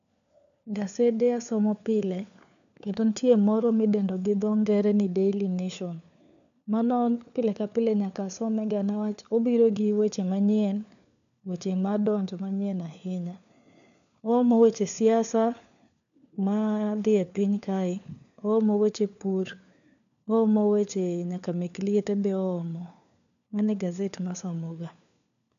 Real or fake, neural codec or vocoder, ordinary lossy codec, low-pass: fake; codec, 16 kHz, 4 kbps, FunCodec, trained on LibriTTS, 50 frames a second; none; 7.2 kHz